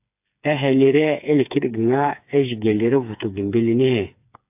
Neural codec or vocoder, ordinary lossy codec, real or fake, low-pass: codec, 16 kHz, 4 kbps, FreqCodec, smaller model; AAC, 32 kbps; fake; 3.6 kHz